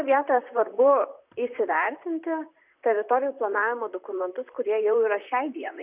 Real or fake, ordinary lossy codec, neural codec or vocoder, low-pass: fake; Opus, 64 kbps; vocoder, 44.1 kHz, 128 mel bands, Pupu-Vocoder; 3.6 kHz